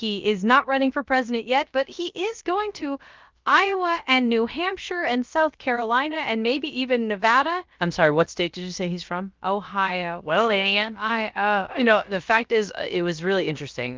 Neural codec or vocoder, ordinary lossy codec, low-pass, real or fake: codec, 16 kHz, about 1 kbps, DyCAST, with the encoder's durations; Opus, 24 kbps; 7.2 kHz; fake